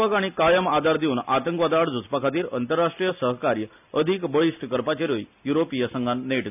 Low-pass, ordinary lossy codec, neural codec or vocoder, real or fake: 3.6 kHz; none; none; real